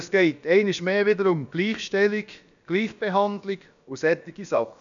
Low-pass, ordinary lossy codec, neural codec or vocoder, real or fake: 7.2 kHz; MP3, 96 kbps; codec, 16 kHz, about 1 kbps, DyCAST, with the encoder's durations; fake